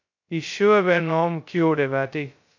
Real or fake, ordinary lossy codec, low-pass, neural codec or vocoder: fake; MP3, 48 kbps; 7.2 kHz; codec, 16 kHz, 0.2 kbps, FocalCodec